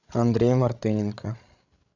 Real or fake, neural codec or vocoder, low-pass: fake; codec, 16 kHz, 16 kbps, FreqCodec, larger model; 7.2 kHz